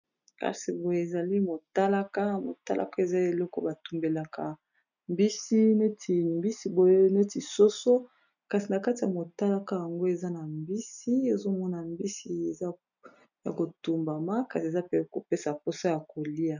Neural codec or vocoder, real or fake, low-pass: none; real; 7.2 kHz